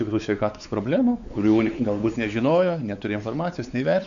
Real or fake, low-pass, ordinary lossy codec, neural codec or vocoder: fake; 7.2 kHz; MP3, 96 kbps; codec, 16 kHz, 4 kbps, X-Codec, WavLM features, trained on Multilingual LibriSpeech